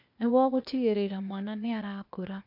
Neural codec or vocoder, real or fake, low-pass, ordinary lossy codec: codec, 16 kHz, 0.8 kbps, ZipCodec; fake; 5.4 kHz; none